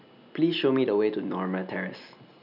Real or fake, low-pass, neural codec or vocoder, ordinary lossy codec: real; 5.4 kHz; none; none